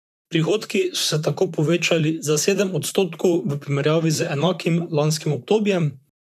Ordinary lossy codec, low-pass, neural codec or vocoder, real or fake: none; 14.4 kHz; vocoder, 44.1 kHz, 128 mel bands, Pupu-Vocoder; fake